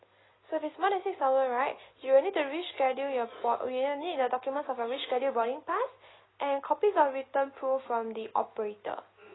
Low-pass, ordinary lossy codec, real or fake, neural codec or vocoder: 7.2 kHz; AAC, 16 kbps; real; none